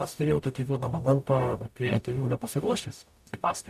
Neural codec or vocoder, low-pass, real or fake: codec, 44.1 kHz, 0.9 kbps, DAC; 14.4 kHz; fake